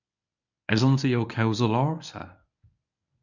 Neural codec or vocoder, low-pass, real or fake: codec, 24 kHz, 0.9 kbps, WavTokenizer, medium speech release version 1; 7.2 kHz; fake